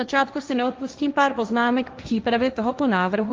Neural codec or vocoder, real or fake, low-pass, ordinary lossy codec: codec, 16 kHz, 1.1 kbps, Voila-Tokenizer; fake; 7.2 kHz; Opus, 24 kbps